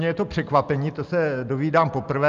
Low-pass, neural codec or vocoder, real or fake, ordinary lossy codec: 7.2 kHz; none; real; Opus, 24 kbps